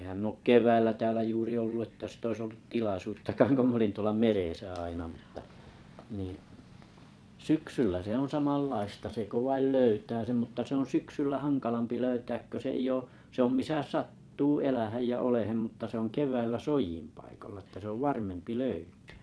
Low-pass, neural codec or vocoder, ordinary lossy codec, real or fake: none; vocoder, 22.05 kHz, 80 mel bands, Vocos; none; fake